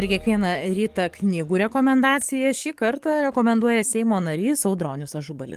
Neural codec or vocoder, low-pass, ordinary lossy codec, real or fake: codec, 44.1 kHz, 7.8 kbps, DAC; 14.4 kHz; Opus, 24 kbps; fake